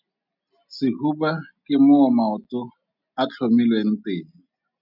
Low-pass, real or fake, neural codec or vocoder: 5.4 kHz; real; none